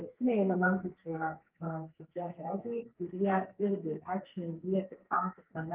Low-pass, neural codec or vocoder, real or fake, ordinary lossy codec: 3.6 kHz; codec, 32 kHz, 1.9 kbps, SNAC; fake; Opus, 32 kbps